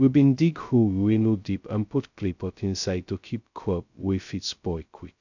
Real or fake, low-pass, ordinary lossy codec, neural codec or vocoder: fake; 7.2 kHz; none; codec, 16 kHz, 0.2 kbps, FocalCodec